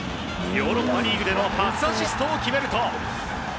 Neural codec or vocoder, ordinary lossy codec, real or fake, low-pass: none; none; real; none